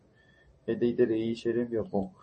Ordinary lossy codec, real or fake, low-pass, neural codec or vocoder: MP3, 32 kbps; real; 10.8 kHz; none